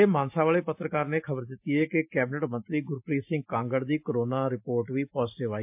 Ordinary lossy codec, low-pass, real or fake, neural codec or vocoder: MP3, 32 kbps; 3.6 kHz; real; none